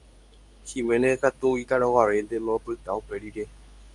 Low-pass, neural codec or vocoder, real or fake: 10.8 kHz; codec, 24 kHz, 0.9 kbps, WavTokenizer, medium speech release version 2; fake